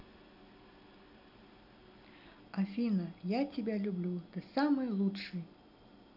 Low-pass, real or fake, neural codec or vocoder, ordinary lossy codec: 5.4 kHz; real; none; none